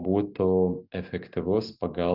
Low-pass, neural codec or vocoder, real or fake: 5.4 kHz; none; real